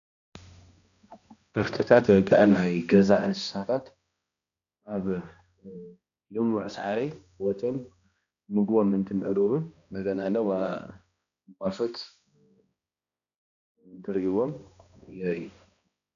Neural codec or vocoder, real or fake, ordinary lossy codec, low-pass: codec, 16 kHz, 1 kbps, X-Codec, HuBERT features, trained on balanced general audio; fake; MP3, 96 kbps; 7.2 kHz